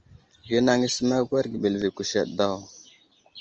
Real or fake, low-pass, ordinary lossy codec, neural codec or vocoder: real; 7.2 kHz; Opus, 24 kbps; none